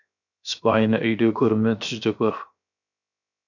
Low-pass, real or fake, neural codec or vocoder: 7.2 kHz; fake; codec, 16 kHz, 0.7 kbps, FocalCodec